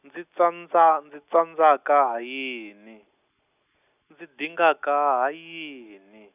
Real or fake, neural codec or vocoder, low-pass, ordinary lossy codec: real; none; 3.6 kHz; none